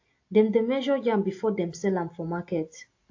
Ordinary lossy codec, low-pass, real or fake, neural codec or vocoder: AAC, 48 kbps; 7.2 kHz; real; none